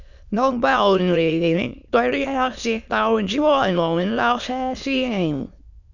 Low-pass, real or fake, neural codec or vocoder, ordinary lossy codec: 7.2 kHz; fake; autoencoder, 22.05 kHz, a latent of 192 numbers a frame, VITS, trained on many speakers; none